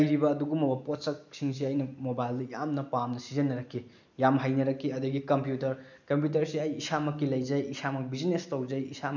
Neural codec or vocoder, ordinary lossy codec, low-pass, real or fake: none; none; 7.2 kHz; real